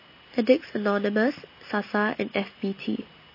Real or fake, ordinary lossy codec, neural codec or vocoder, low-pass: real; MP3, 24 kbps; none; 5.4 kHz